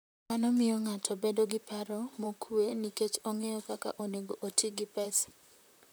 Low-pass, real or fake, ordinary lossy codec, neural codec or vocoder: none; fake; none; vocoder, 44.1 kHz, 128 mel bands, Pupu-Vocoder